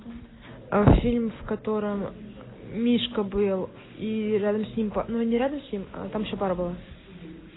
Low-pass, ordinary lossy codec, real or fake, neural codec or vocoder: 7.2 kHz; AAC, 16 kbps; real; none